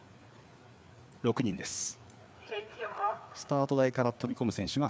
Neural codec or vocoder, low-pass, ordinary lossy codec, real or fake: codec, 16 kHz, 4 kbps, FreqCodec, larger model; none; none; fake